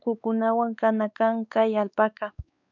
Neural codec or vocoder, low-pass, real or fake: codec, 16 kHz, 4 kbps, X-Codec, WavLM features, trained on Multilingual LibriSpeech; 7.2 kHz; fake